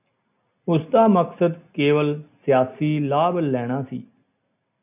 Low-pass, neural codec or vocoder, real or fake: 3.6 kHz; none; real